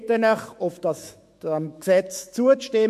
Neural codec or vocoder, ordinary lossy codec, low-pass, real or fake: autoencoder, 48 kHz, 128 numbers a frame, DAC-VAE, trained on Japanese speech; MP3, 64 kbps; 14.4 kHz; fake